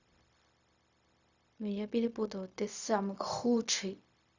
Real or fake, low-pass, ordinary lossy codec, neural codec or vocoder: fake; 7.2 kHz; Opus, 64 kbps; codec, 16 kHz, 0.4 kbps, LongCat-Audio-Codec